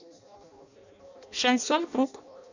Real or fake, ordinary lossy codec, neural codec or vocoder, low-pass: fake; none; codec, 16 kHz in and 24 kHz out, 0.6 kbps, FireRedTTS-2 codec; 7.2 kHz